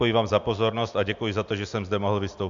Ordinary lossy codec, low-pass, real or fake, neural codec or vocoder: MP3, 64 kbps; 7.2 kHz; real; none